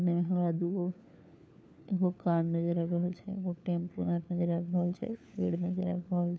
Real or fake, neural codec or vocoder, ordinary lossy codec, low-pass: fake; codec, 16 kHz, 4 kbps, FunCodec, trained on LibriTTS, 50 frames a second; none; none